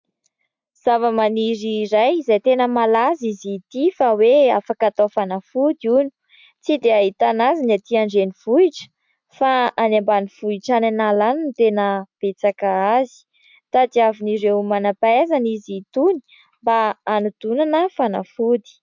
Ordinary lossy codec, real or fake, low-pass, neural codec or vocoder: MP3, 64 kbps; real; 7.2 kHz; none